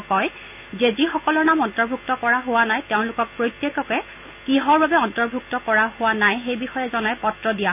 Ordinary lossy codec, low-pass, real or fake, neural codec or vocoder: none; 3.6 kHz; real; none